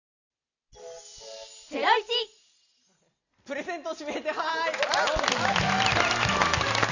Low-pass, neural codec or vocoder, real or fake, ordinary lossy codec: 7.2 kHz; none; real; none